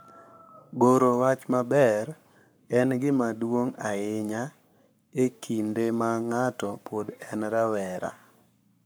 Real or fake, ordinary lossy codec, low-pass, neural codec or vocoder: fake; none; none; codec, 44.1 kHz, 7.8 kbps, Pupu-Codec